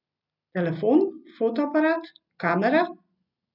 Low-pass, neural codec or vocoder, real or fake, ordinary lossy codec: 5.4 kHz; none; real; none